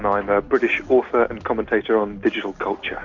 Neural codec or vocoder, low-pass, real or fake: none; 7.2 kHz; real